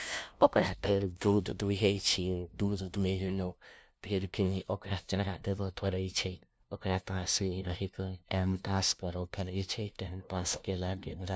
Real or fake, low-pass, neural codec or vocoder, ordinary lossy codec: fake; none; codec, 16 kHz, 0.5 kbps, FunCodec, trained on LibriTTS, 25 frames a second; none